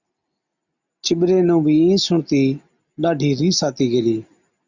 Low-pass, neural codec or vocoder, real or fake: 7.2 kHz; none; real